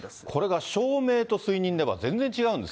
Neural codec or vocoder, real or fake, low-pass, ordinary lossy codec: none; real; none; none